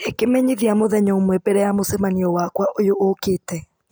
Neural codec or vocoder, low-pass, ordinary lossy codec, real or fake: none; none; none; real